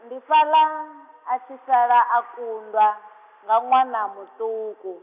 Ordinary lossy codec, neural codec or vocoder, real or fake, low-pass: none; none; real; 3.6 kHz